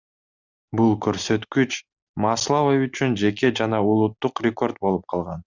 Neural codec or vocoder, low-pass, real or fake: none; 7.2 kHz; real